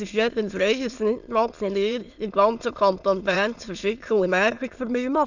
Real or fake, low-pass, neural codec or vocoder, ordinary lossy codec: fake; 7.2 kHz; autoencoder, 22.05 kHz, a latent of 192 numbers a frame, VITS, trained on many speakers; none